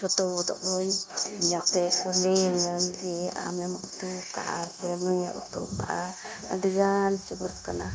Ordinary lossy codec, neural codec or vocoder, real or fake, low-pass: none; codec, 16 kHz, 0.9 kbps, LongCat-Audio-Codec; fake; none